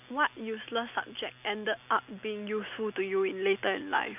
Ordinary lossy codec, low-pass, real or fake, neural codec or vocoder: AAC, 32 kbps; 3.6 kHz; real; none